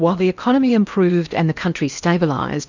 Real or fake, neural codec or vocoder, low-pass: fake; codec, 16 kHz in and 24 kHz out, 0.8 kbps, FocalCodec, streaming, 65536 codes; 7.2 kHz